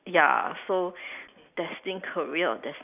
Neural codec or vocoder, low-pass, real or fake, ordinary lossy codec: none; 3.6 kHz; real; none